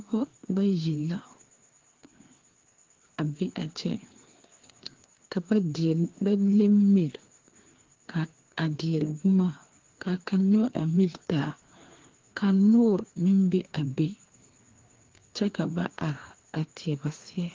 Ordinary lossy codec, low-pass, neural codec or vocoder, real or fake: Opus, 16 kbps; 7.2 kHz; codec, 16 kHz, 2 kbps, FreqCodec, larger model; fake